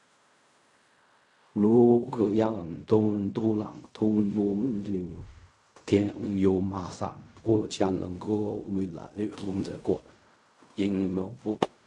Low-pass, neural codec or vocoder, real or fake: 10.8 kHz; codec, 16 kHz in and 24 kHz out, 0.4 kbps, LongCat-Audio-Codec, fine tuned four codebook decoder; fake